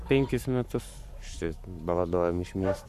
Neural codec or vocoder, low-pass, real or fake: codec, 44.1 kHz, 7.8 kbps, Pupu-Codec; 14.4 kHz; fake